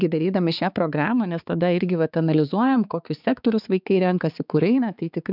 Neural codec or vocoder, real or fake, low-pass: codec, 16 kHz, 4 kbps, X-Codec, HuBERT features, trained on balanced general audio; fake; 5.4 kHz